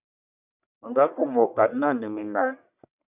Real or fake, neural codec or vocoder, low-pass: fake; codec, 44.1 kHz, 1.7 kbps, Pupu-Codec; 3.6 kHz